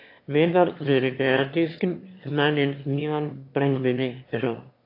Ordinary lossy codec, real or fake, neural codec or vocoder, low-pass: AAC, 32 kbps; fake; autoencoder, 22.05 kHz, a latent of 192 numbers a frame, VITS, trained on one speaker; 5.4 kHz